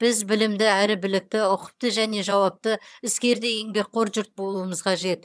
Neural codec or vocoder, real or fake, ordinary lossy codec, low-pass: vocoder, 22.05 kHz, 80 mel bands, HiFi-GAN; fake; none; none